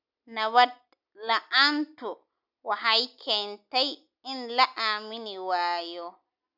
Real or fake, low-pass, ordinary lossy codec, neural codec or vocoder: real; 5.4 kHz; none; none